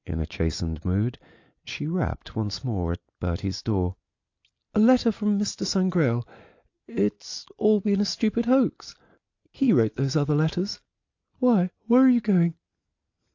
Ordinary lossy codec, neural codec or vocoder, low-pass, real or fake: AAC, 48 kbps; none; 7.2 kHz; real